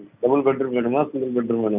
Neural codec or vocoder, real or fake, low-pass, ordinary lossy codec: none; real; 3.6 kHz; none